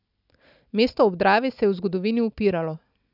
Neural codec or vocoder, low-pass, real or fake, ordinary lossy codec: none; 5.4 kHz; real; none